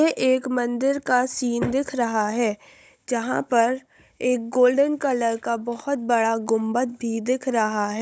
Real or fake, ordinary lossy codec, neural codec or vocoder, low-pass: fake; none; codec, 16 kHz, 16 kbps, FunCodec, trained on Chinese and English, 50 frames a second; none